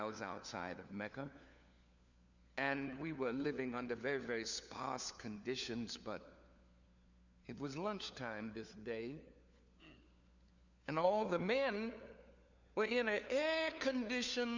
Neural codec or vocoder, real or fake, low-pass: codec, 16 kHz, 4 kbps, FunCodec, trained on LibriTTS, 50 frames a second; fake; 7.2 kHz